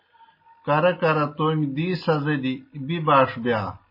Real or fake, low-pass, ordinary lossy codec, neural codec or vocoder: real; 5.4 kHz; MP3, 24 kbps; none